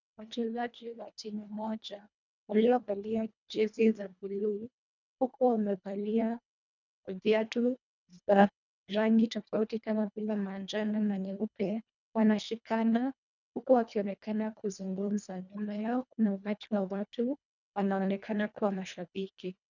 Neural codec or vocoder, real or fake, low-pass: codec, 24 kHz, 1.5 kbps, HILCodec; fake; 7.2 kHz